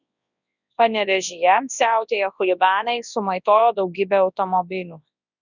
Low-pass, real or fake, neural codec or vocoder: 7.2 kHz; fake; codec, 24 kHz, 0.9 kbps, WavTokenizer, large speech release